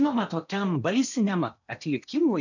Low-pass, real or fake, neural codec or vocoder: 7.2 kHz; fake; codec, 16 kHz in and 24 kHz out, 0.8 kbps, FocalCodec, streaming, 65536 codes